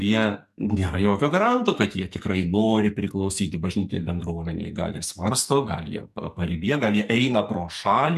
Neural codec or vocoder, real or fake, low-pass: codec, 44.1 kHz, 2.6 kbps, SNAC; fake; 14.4 kHz